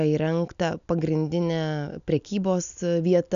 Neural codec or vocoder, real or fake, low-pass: none; real; 7.2 kHz